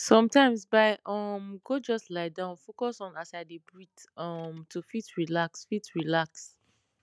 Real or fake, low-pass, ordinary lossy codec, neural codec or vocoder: real; none; none; none